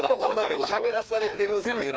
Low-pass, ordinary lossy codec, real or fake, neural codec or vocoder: none; none; fake; codec, 16 kHz, 2 kbps, FreqCodec, larger model